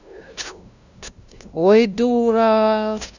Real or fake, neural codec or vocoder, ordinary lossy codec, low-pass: fake; codec, 16 kHz, 0.5 kbps, FunCodec, trained on LibriTTS, 25 frames a second; none; 7.2 kHz